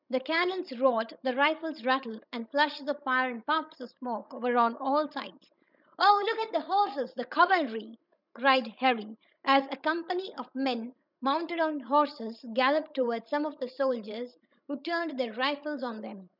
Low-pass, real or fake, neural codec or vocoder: 5.4 kHz; fake; codec, 16 kHz, 16 kbps, FreqCodec, larger model